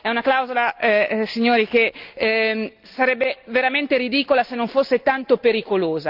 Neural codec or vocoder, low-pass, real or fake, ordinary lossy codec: none; 5.4 kHz; real; Opus, 32 kbps